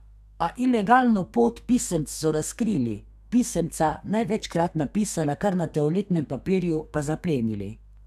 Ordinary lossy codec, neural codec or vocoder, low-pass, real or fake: none; codec, 32 kHz, 1.9 kbps, SNAC; 14.4 kHz; fake